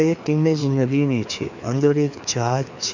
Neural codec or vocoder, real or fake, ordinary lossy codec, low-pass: codec, 16 kHz, 2 kbps, FreqCodec, larger model; fake; none; 7.2 kHz